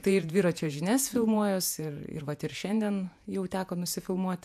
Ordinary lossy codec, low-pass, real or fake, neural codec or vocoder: AAC, 96 kbps; 14.4 kHz; fake; vocoder, 48 kHz, 128 mel bands, Vocos